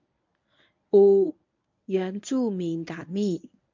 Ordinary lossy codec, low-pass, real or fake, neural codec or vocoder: MP3, 64 kbps; 7.2 kHz; fake; codec, 24 kHz, 0.9 kbps, WavTokenizer, medium speech release version 1